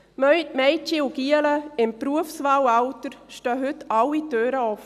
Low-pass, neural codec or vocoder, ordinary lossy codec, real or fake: 14.4 kHz; none; none; real